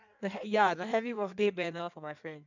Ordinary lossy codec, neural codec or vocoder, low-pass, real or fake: none; codec, 16 kHz in and 24 kHz out, 1.1 kbps, FireRedTTS-2 codec; 7.2 kHz; fake